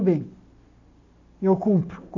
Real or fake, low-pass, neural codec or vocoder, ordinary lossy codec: real; 7.2 kHz; none; AAC, 48 kbps